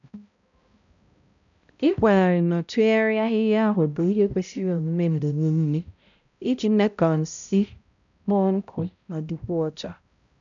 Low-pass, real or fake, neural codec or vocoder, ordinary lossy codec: 7.2 kHz; fake; codec, 16 kHz, 0.5 kbps, X-Codec, HuBERT features, trained on balanced general audio; none